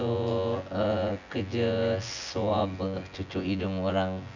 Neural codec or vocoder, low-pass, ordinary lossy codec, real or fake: vocoder, 24 kHz, 100 mel bands, Vocos; 7.2 kHz; none; fake